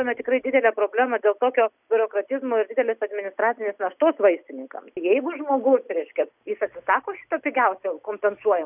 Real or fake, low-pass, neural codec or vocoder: real; 3.6 kHz; none